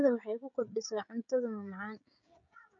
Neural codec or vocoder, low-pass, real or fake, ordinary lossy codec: codec, 16 kHz, 16 kbps, FreqCodec, smaller model; 7.2 kHz; fake; none